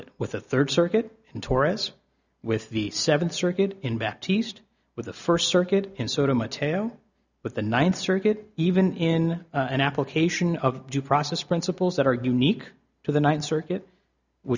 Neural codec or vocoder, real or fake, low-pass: none; real; 7.2 kHz